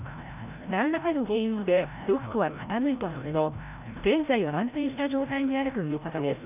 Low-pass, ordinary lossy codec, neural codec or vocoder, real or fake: 3.6 kHz; none; codec, 16 kHz, 0.5 kbps, FreqCodec, larger model; fake